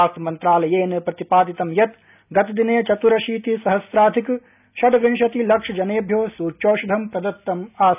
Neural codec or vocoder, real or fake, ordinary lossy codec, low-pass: none; real; none; 3.6 kHz